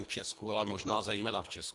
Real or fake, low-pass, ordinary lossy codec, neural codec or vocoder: fake; 10.8 kHz; AAC, 64 kbps; codec, 24 kHz, 1.5 kbps, HILCodec